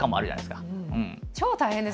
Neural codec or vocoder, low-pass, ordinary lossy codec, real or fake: none; none; none; real